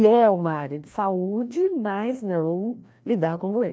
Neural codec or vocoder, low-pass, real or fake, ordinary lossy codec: codec, 16 kHz, 1 kbps, FreqCodec, larger model; none; fake; none